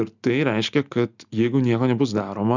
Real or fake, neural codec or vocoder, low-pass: real; none; 7.2 kHz